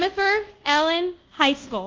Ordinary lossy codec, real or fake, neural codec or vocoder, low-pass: Opus, 32 kbps; fake; codec, 24 kHz, 0.5 kbps, DualCodec; 7.2 kHz